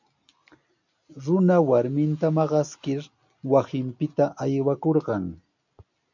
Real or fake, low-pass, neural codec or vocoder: real; 7.2 kHz; none